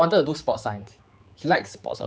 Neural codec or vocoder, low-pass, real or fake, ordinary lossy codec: codec, 16 kHz, 4 kbps, X-Codec, HuBERT features, trained on general audio; none; fake; none